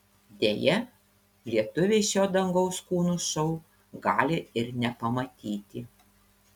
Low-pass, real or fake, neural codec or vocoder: 19.8 kHz; real; none